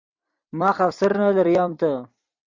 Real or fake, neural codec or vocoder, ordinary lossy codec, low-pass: fake; vocoder, 44.1 kHz, 128 mel bands every 256 samples, BigVGAN v2; Opus, 64 kbps; 7.2 kHz